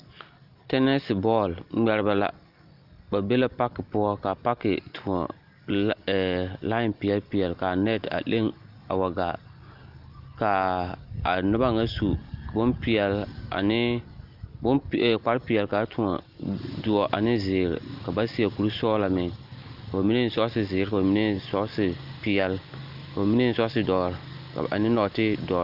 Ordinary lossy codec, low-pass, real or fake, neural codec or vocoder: Opus, 32 kbps; 5.4 kHz; real; none